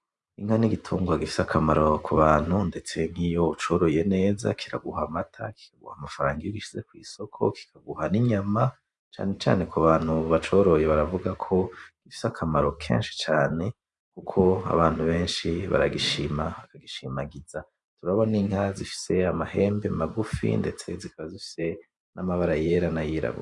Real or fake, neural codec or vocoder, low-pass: fake; vocoder, 44.1 kHz, 128 mel bands every 512 samples, BigVGAN v2; 10.8 kHz